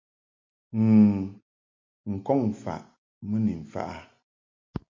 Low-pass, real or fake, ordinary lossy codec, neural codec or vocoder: 7.2 kHz; real; MP3, 48 kbps; none